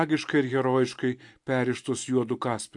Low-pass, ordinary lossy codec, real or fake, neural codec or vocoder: 10.8 kHz; AAC, 64 kbps; real; none